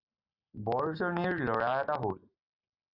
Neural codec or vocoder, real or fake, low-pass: none; real; 5.4 kHz